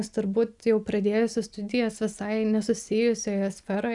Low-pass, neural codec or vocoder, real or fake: 10.8 kHz; none; real